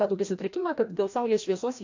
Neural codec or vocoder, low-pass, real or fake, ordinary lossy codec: codec, 16 kHz, 1 kbps, FreqCodec, larger model; 7.2 kHz; fake; AAC, 48 kbps